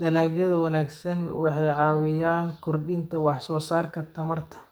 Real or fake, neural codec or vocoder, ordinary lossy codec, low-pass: fake; codec, 44.1 kHz, 2.6 kbps, SNAC; none; none